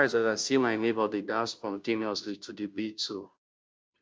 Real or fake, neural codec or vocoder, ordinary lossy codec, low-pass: fake; codec, 16 kHz, 0.5 kbps, FunCodec, trained on Chinese and English, 25 frames a second; none; none